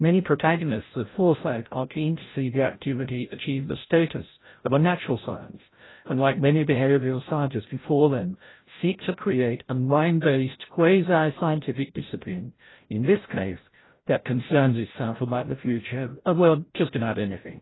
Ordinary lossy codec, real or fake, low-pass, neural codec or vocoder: AAC, 16 kbps; fake; 7.2 kHz; codec, 16 kHz, 0.5 kbps, FreqCodec, larger model